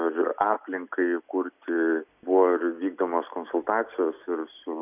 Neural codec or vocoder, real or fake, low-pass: none; real; 3.6 kHz